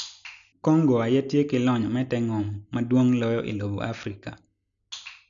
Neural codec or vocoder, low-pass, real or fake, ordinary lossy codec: none; 7.2 kHz; real; none